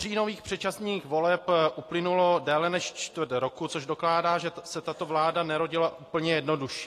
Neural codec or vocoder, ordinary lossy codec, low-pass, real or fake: none; AAC, 48 kbps; 14.4 kHz; real